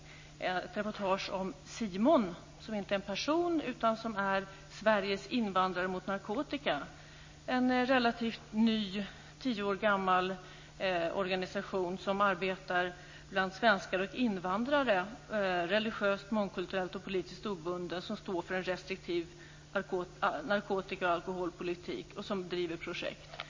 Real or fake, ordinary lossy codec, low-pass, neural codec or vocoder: real; MP3, 32 kbps; 7.2 kHz; none